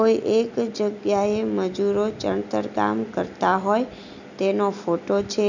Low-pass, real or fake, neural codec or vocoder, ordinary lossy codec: 7.2 kHz; real; none; none